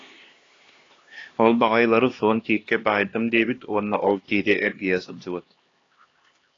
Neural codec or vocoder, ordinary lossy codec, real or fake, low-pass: codec, 16 kHz, 2 kbps, X-Codec, HuBERT features, trained on LibriSpeech; AAC, 32 kbps; fake; 7.2 kHz